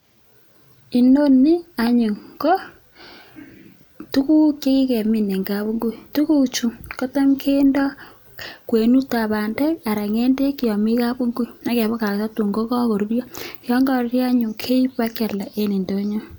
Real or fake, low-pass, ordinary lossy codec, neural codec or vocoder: real; none; none; none